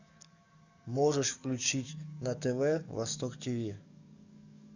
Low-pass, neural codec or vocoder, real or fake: 7.2 kHz; codec, 44.1 kHz, 7.8 kbps, DAC; fake